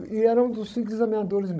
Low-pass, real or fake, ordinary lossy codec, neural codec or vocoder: none; fake; none; codec, 16 kHz, 16 kbps, FunCodec, trained on Chinese and English, 50 frames a second